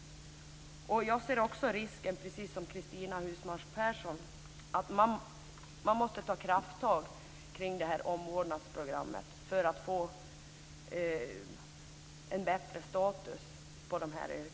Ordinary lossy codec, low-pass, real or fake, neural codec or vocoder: none; none; real; none